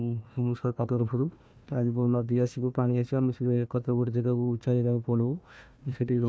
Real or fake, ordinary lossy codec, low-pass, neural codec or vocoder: fake; none; none; codec, 16 kHz, 1 kbps, FunCodec, trained on Chinese and English, 50 frames a second